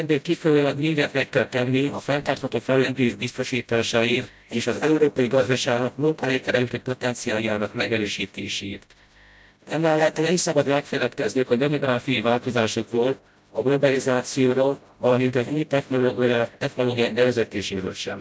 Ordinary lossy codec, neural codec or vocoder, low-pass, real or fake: none; codec, 16 kHz, 0.5 kbps, FreqCodec, smaller model; none; fake